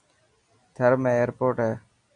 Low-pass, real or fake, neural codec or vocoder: 9.9 kHz; real; none